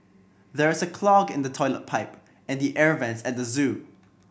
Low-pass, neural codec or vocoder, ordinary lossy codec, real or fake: none; none; none; real